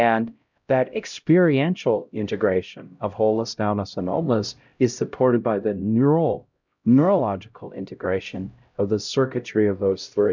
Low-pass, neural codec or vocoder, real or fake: 7.2 kHz; codec, 16 kHz, 0.5 kbps, X-Codec, HuBERT features, trained on LibriSpeech; fake